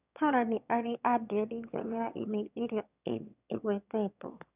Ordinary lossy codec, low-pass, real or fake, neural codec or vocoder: none; 3.6 kHz; fake; autoencoder, 22.05 kHz, a latent of 192 numbers a frame, VITS, trained on one speaker